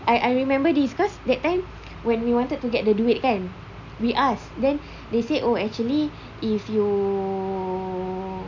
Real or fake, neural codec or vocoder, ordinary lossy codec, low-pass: real; none; none; 7.2 kHz